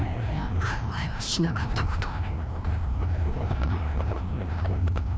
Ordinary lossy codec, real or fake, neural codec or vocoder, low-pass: none; fake; codec, 16 kHz, 1 kbps, FreqCodec, larger model; none